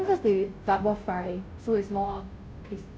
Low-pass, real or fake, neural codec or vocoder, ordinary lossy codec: none; fake; codec, 16 kHz, 0.5 kbps, FunCodec, trained on Chinese and English, 25 frames a second; none